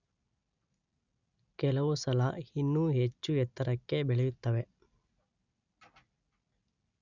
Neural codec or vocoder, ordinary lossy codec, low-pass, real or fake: none; none; 7.2 kHz; real